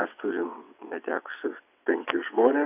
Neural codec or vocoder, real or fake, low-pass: vocoder, 22.05 kHz, 80 mel bands, WaveNeXt; fake; 3.6 kHz